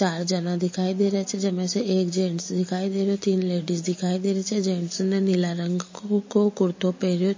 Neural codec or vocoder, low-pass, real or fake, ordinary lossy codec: none; 7.2 kHz; real; MP3, 32 kbps